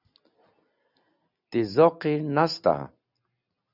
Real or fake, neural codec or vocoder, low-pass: real; none; 5.4 kHz